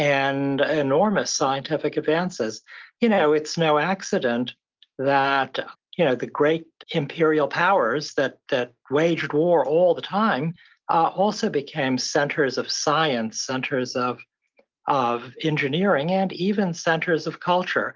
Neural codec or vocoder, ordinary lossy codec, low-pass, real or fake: none; Opus, 32 kbps; 7.2 kHz; real